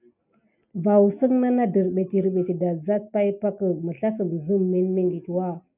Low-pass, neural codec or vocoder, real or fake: 3.6 kHz; none; real